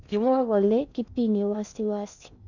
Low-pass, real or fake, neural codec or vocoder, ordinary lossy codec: 7.2 kHz; fake; codec, 16 kHz in and 24 kHz out, 0.6 kbps, FocalCodec, streaming, 4096 codes; none